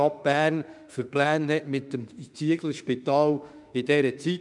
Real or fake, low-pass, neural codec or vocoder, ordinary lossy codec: fake; 10.8 kHz; autoencoder, 48 kHz, 32 numbers a frame, DAC-VAE, trained on Japanese speech; none